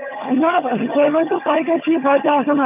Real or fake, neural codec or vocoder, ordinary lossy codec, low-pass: fake; vocoder, 22.05 kHz, 80 mel bands, HiFi-GAN; none; 3.6 kHz